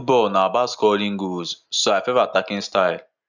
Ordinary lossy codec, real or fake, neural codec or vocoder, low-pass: none; real; none; 7.2 kHz